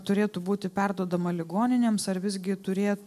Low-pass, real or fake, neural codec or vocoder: 14.4 kHz; real; none